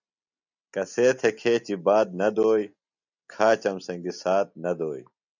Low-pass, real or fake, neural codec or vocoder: 7.2 kHz; real; none